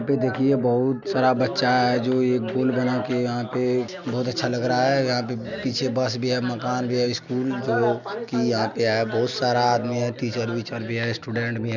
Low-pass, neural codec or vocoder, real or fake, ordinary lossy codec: 7.2 kHz; none; real; none